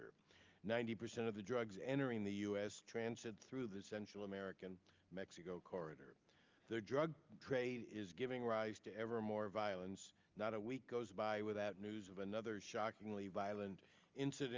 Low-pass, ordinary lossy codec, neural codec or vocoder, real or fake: 7.2 kHz; Opus, 32 kbps; none; real